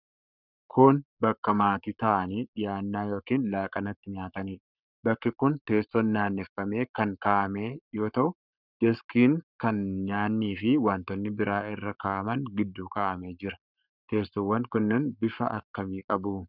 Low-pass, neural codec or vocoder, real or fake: 5.4 kHz; codec, 44.1 kHz, 7.8 kbps, Pupu-Codec; fake